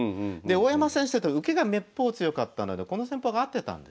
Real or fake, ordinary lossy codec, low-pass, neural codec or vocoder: real; none; none; none